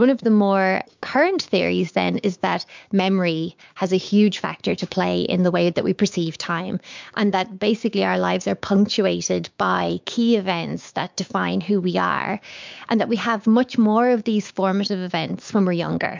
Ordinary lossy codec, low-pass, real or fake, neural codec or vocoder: MP3, 64 kbps; 7.2 kHz; fake; codec, 16 kHz, 6 kbps, DAC